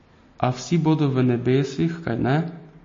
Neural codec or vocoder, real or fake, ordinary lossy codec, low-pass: none; real; MP3, 32 kbps; 7.2 kHz